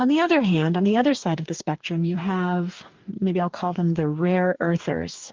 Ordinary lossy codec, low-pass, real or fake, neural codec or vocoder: Opus, 32 kbps; 7.2 kHz; fake; codec, 44.1 kHz, 2.6 kbps, DAC